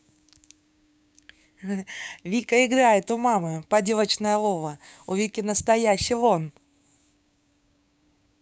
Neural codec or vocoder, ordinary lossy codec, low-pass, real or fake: codec, 16 kHz, 6 kbps, DAC; none; none; fake